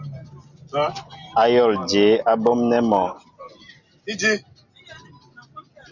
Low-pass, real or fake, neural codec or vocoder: 7.2 kHz; real; none